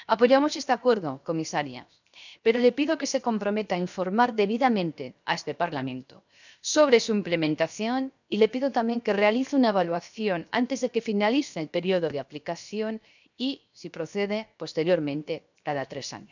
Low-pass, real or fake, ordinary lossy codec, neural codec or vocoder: 7.2 kHz; fake; none; codec, 16 kHz, 0.7 kbps, FocalCodec